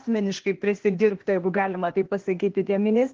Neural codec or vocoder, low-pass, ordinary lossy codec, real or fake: codec, 16 kHz, 0.8 kbps, ZipCodec; 7.2 kHz; Opus, 16 kbps; fake